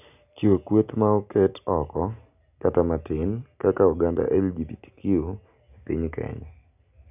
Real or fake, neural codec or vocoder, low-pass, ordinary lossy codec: real; none; 3.6 kHz; none